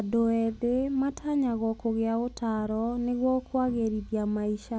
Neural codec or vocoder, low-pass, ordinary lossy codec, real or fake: none; none; none; real